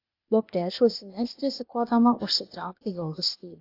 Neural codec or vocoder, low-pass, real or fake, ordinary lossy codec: codec, 16 kHz, 0.8 kbps, ZipCodec; 5.4 kHz; fake; AAC, 32 kbps